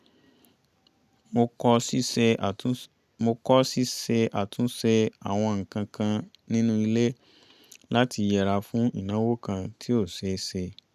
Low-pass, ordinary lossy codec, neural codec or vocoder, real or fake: 14.4 kHz; none; none; real